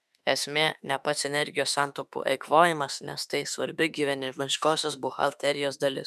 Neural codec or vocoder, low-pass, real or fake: autoencoder, 48 kHz, 32 numbers a frame, DAC-VAE, trained on Japanese speech; 14.4 kHz; fake